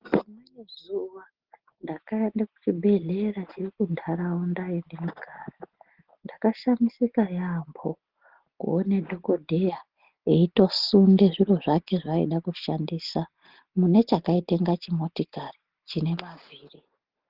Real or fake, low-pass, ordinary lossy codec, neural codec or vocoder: real; 5.4 kHz; Opus, 16 kbps; none